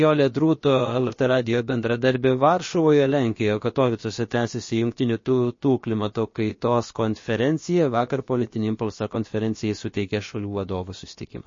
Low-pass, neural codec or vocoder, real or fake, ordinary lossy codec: 7.2 kHz; codec, 16 kHz, about 1 kbps, DyCAST, with the encoder's durations; fake; MP3, 32 kbps